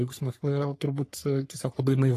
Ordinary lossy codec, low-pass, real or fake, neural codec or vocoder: AAC, 48 kbps; 14.4 kHz; fake; codec, 44.1 kHz, 3.4 kbps, Pupu-Codec